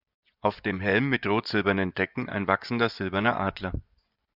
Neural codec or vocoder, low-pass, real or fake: vocoder, 24 kHz, 100 mel bands, Vocos; 5.4 kHz; fake